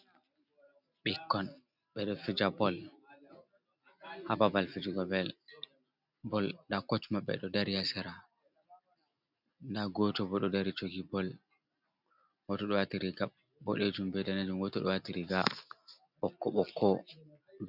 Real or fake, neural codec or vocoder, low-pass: real; none; 5.4 kHz